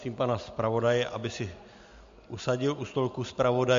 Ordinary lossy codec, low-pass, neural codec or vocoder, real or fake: MP3, 48 kbps; 7.2 kHz; none; real